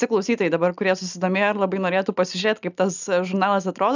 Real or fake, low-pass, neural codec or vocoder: real; 7.2 kHz; none